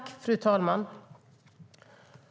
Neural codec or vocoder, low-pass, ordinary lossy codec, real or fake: none; none; none; real